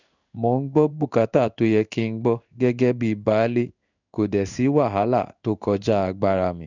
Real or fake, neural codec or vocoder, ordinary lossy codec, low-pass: fake; codec, 16 kHz in and 24 kHz out, 1 kbps, XY-Tokenizer; none; 7.2 kHz